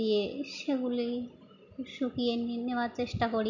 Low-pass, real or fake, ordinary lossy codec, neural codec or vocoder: 7.2 kHz; real; none; none